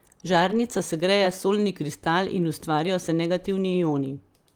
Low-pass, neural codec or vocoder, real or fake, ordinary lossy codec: 19.8 kHz; vocoder, 44.1 kHz, 128 mel bands, Pupu-Vocoder; fake; Opus, 32 kbps